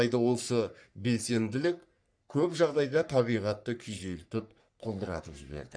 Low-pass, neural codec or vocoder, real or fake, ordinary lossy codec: 9.9 kHz; codec, 44.1 kHz, 3.4 kbps, Pupu-Codec; fake; none